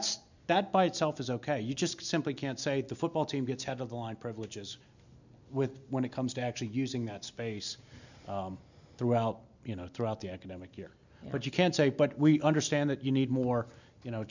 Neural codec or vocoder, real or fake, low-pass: none; real; 7.2 kHz